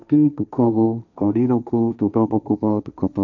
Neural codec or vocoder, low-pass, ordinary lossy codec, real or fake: codec, 16 kHz, 1.1 kbps, Voila-Tokenizer; 7.2 kHz; none; fake